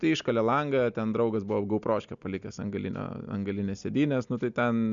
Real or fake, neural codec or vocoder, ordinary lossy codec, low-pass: real; none; Opus, 64 kbps; 7.2 kHz